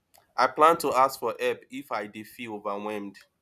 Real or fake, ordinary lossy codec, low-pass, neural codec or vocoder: real; none; 14.4 kHz; none